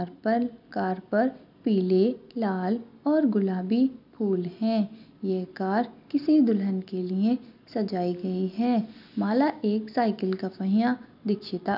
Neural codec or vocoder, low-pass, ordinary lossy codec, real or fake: none; 5.4 kHz; none; real